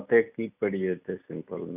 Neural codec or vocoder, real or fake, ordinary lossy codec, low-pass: none; real; none; 3.6 kHz